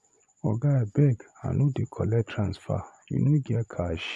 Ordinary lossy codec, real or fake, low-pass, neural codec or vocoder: none; fake; none; vocoder, 24 kHz, 100 mel bands, Vocos